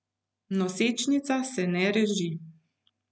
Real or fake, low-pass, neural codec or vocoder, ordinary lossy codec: real; none; none; none